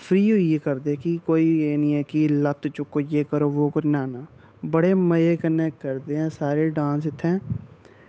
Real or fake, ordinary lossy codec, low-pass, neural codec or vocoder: fake; none; none; codec, 16 kHz, 8 kbps, FunCodec, trained on Chinese and English, 25 frames a second